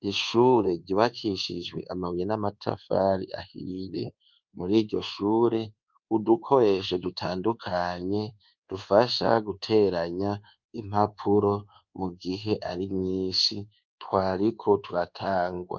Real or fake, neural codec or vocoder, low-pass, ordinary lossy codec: fake; codec, 24 kHz, 1.2 kbps, DualCodec; 7.2 kHz; Opus, 24 kbps